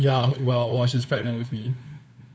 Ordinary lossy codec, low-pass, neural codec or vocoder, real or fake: none; none; codec, 16 kHz, 2 kbps, FunCodec, trained on LibriTTS, 25 frames a second; fake